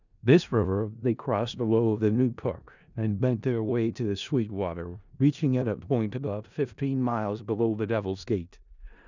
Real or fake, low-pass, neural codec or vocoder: fake; 7.2 kHz; codec, 16 kHz in and 24 kHz out, 0.4 kbps, LongCat-Audio-Codec, four codebook decoder